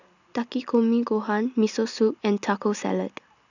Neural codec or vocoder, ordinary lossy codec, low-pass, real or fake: none; none; 7.2 kHz; real